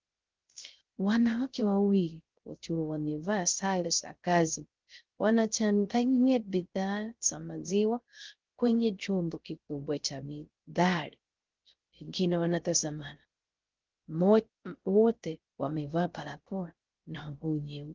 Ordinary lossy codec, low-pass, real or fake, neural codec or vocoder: Opus, 16 kbps; 7.2 kHz; fake; codec, 16 kHz, 0.3 kbps, FocalCodec